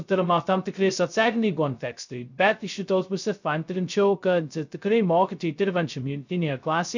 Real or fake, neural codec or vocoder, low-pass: fake; codec, 16 kHz, 0.2 kbps, FocalCodec; 7.2 kHz